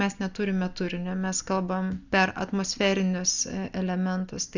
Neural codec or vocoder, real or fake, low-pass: none; real; 7.2 kHz